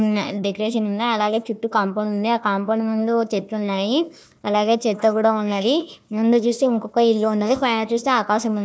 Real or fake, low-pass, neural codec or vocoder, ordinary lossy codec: fake; none; codec, 16 kHz, 1 kbps, FunCodec, trained on Chinese and English, 50 frames a second; none